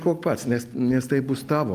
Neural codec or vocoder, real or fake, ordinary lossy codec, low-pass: none; real; Opus, 32 kbps; 14.4 kHz